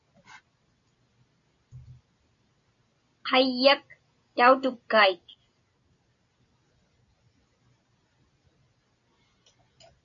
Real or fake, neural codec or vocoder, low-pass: real; none; 7.2 kHz